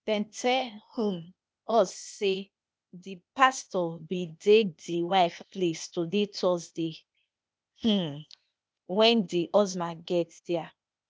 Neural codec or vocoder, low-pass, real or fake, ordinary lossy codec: codec, 16 kHz, 0.8 kbps, ZipCodec; none; fake; none